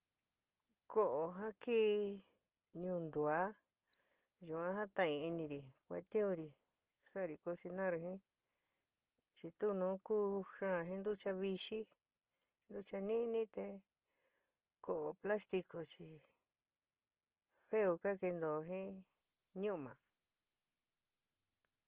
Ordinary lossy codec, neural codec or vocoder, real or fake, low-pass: Opus, 16 kbps; none; real; 3.6 kHz